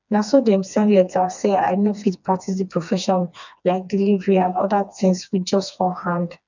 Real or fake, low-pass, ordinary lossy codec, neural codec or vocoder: fake; 7.2 kHz; none; codec, 16 kHz, 2 kbps, FreqCodec, smaller model